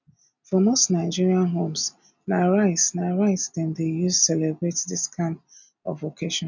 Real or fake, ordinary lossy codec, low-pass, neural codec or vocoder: real; none; 7.2 kHz; none